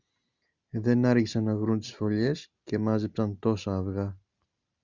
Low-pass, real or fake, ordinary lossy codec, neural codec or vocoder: 7.2 kHz; real; Opus, 64 kbps; none